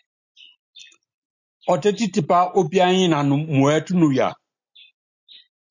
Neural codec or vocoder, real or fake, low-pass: none; real; 7.2 kHz